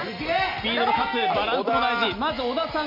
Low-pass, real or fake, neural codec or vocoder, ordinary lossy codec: 5.4 kHz; real; none; none